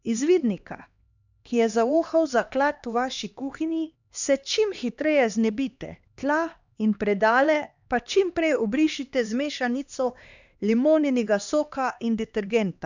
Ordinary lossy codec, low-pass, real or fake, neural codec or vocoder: none; 7.2 kHz; fake; codec, 16 kHz, 2 kbps, X-Codec, HuBERT features, trained on LibriSpeech